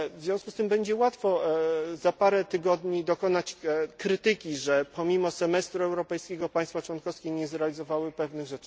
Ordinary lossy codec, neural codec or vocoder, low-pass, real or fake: none; none; none; real